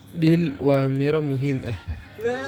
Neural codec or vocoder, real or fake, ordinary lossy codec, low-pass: codec, 44.1 kHz, 2.6 kbps, SNAC; fake; none; none